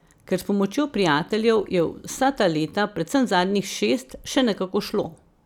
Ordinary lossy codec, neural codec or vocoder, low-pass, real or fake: none; none; 19.8 kHz; real